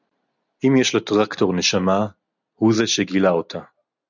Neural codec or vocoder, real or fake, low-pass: none; real; 7.2 kHz